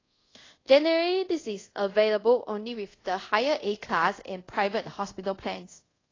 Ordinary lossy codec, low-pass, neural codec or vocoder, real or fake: AAC, 32 kbps; 7.2 kHz; codec, 24 kHz, 0.5 kbps, DualCodec; fake